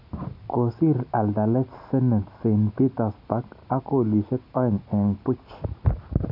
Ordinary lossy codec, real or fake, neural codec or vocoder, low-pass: none; real; none; 5.4 kHz